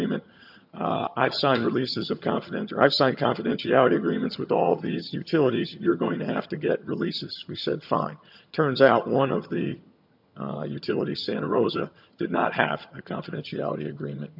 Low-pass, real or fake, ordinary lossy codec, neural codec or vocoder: 5.4 kHz; fake; MP3, 48 kbps; vocoder, 22.05 kHz, 80 mel bands, HiFi-GAN